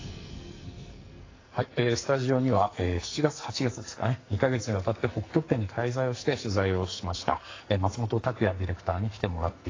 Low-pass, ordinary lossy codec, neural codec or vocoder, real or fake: 7.2 kHz; AAC, 32 kbps; codec, 44.1 kHz, 2.6 kbps, SNAC; fake